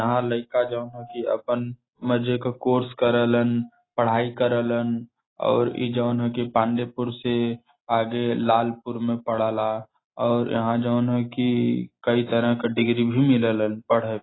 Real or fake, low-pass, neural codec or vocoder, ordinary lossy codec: real; 7.2 kHz; none; AAC, 16 kbps